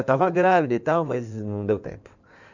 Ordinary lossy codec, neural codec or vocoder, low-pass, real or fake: none; codec, 16 kHz in and 24 kHz out, 2.2 kbps, FireRedTTS-2 codec; 7.2 kHz; fake